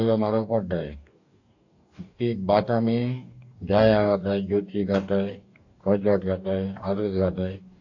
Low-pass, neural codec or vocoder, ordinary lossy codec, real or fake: 7.2 kHz; codec, 44.1 kHz, 2.6 kbps, DAC; AAC, 48 kbps; fake